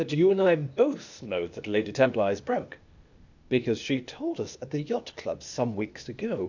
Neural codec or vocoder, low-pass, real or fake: codec, 16 kHz, 0.8 kbps, ZipCodec; 7.2 kHz; fake